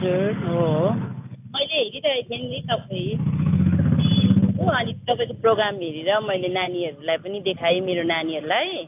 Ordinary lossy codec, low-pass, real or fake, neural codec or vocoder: AAC, 32 kbps; 3.6 kHz; real; none